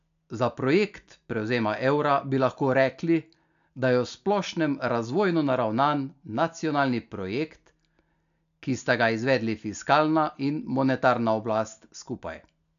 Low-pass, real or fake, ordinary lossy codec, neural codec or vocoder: 7.2 kHz; real; AAC, 96 kbps; none